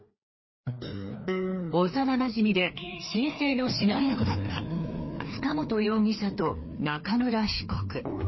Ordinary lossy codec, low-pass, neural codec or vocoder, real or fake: MP3, 24 kbps; 7.2 kHz; codec, 16 kHz, 2 kbps, FreqCodec, larger model; fake